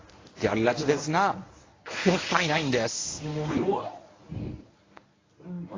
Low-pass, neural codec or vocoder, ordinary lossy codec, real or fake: 7.2 kHz; codec, 24 kHz, 0.9 kbps, WavTokenizer, medium speech release version 1; AAC, 32 kbps; fake